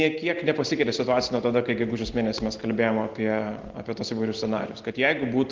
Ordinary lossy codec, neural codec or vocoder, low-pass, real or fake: Opus, 16 kbps; none; 7.2 kHz; real